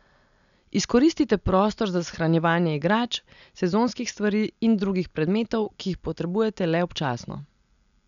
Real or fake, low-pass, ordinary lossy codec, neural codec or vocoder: real; 7.2 kHz; none; none